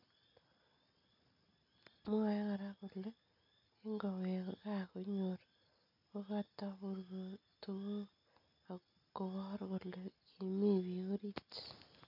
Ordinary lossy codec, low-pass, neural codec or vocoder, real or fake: none; 5.4 kHz; none; real